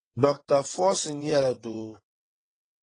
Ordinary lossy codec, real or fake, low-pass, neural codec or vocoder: AAC, 32 kbps; fake; 9.9 kHz; vocoder, 22.05 kHz, 80 mel bands, WaveNeXt